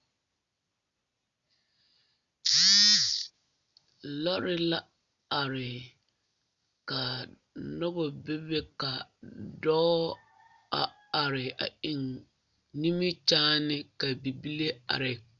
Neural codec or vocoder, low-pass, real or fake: none; 7.2 kHz; real